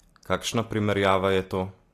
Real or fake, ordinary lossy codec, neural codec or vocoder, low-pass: real; AAC, 48 kbps; none; 14.4 kHz